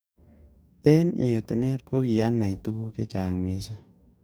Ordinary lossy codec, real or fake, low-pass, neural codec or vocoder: none; fake; none; codec, 44.1 kHz, 2.6 kbps, DAC